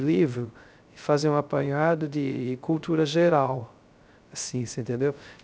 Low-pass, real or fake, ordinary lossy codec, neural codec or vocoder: none; fake; none; codec, 16 kHz, 0.3 kbps, FocalCodec